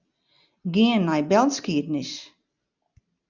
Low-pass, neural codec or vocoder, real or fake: 7.2 kHz; none; real